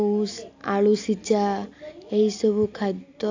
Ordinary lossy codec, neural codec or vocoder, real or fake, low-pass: AAC, 48 kbps; none; real; 7.2 kHz